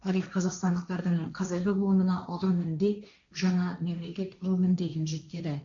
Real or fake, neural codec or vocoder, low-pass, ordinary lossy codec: fake; codec, 16 kHz, 1.1 kbps, Voila-Tokenizer; 7.2 kHz; none